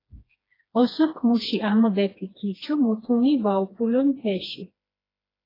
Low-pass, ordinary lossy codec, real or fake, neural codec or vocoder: 5.4 kHz; AAC, 24 kbps; fake; codec, 16 kHz, 2 kbps, FreqCodec, smaller model